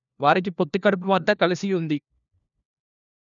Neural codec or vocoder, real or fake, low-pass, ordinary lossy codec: codec, 16 kHz, 1 kbps, FunCodec, trained on LibriTTS, 50 frames a second; fake; 7.2 kHz; none